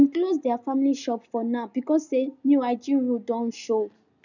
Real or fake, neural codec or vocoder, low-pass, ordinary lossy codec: real; none; 7.2 kHz; none